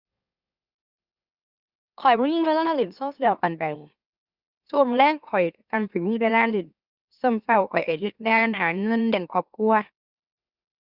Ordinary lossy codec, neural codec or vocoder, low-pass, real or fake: Opus, 64 kbps; autoencoder, 44.1 kHz, a latent of 192 numbers a frame, MeloTTS; 5.4 kHz; fake